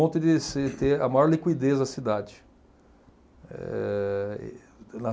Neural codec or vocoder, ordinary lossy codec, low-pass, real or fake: none; none; none; real